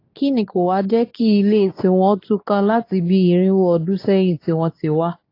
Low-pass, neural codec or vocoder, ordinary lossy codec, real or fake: 5.4 kHz; codec, 24 kHz, 0.9 kbps, WavTokenizer, medium speech release version 2; AAC, 32 kbps; fake